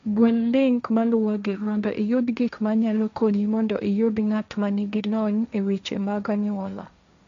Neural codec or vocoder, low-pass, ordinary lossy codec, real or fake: codec, 16 kHz, 1.1 kbps, Voila-Tokenizer; 7.2 kHz; AAC, 96 kbps; fake